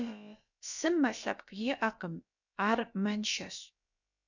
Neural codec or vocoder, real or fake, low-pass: codec, 16 kHz, about 1 kbps, DyCAST, with the encoder's durations; fake; 7.2 kHz